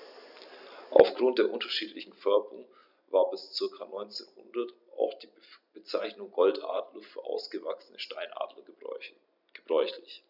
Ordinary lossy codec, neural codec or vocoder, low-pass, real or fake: none; none; 5.4 kHz; real